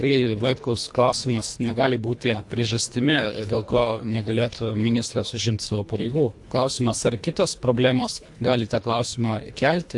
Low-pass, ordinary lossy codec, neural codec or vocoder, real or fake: 10.8 kHz; AAC, 64 kbps; codec, 24 kHz, 1.5 kbps, HILCodec; fake